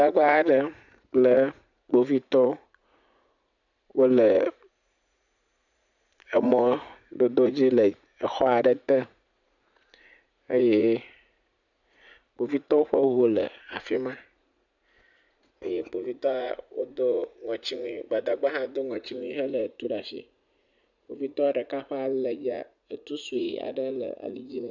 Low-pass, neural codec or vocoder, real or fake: 7.2 kHz; vocoder, 44.1 kHz, 80 mel bands, Vocos; fake